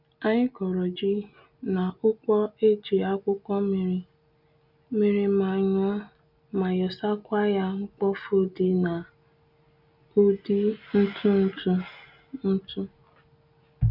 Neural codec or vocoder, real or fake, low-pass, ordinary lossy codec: none; real; 5.4 kHz; none